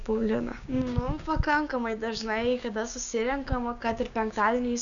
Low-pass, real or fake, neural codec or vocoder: 7.2 kHz; fake; codec, 16 kHz, 6 kbps, DAC